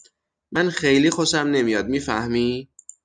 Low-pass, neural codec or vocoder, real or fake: 9.9 kHz; none; real